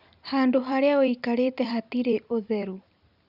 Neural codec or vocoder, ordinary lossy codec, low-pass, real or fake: vocoder, 44.1 kHz, 128 mel bands every 256 samples, BigVGAN v2; Opus, 64 kbps; 5.4 kHz; fake